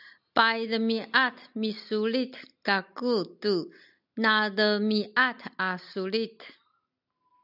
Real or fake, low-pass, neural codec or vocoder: real; 5.4 kHz; none